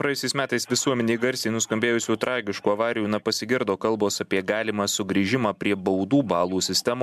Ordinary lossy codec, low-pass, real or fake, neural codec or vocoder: MP3, 96 kbps; 14.4 kHz; real; none